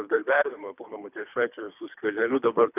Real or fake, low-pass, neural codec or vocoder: fake; 3.6 kHz; codec, 24 kHz, 3 kbps, HILCodec